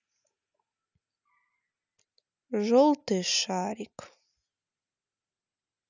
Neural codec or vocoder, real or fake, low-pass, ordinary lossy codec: none; real; 7.2 kHz; none